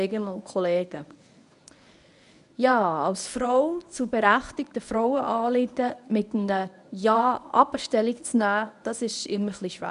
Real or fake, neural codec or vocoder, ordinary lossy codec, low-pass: fake; codec, 24 kHz, 0.9 kbps, WavTokenizer, medium speech release version 1; none; 10.8 kHz